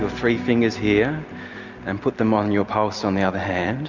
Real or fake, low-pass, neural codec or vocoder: real; 7.2 kHz; none